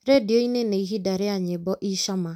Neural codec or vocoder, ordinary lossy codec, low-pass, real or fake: none; none; 19.8 kHz; real